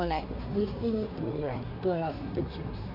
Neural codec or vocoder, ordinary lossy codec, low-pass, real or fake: codec, 24 kHz, 1 kbps, SNAC; none; 5.4 kHz; fake